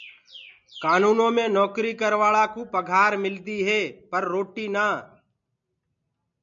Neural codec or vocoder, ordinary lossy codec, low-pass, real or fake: none; MP3, 64 kbps; 7.2 kHz; real